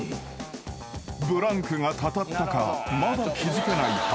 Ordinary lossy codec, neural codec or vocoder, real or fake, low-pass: none; none; real; none